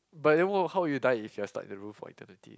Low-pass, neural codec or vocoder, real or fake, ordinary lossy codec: none; none; real; none